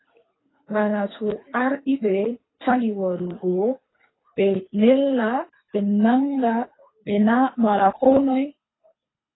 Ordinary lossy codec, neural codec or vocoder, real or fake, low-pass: AAC, 16 kbps; codec, 24 kHz, 3 kbps, HILCodec; fake; 7.2 kHz